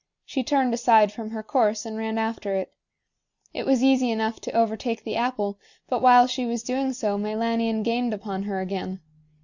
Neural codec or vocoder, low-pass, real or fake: none; 7.2 kHz; real